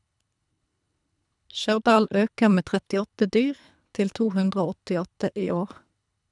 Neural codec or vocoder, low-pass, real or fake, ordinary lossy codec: codec, 24 kHz, 3 kbps, HILCodec; 10.8 kHz; fake; none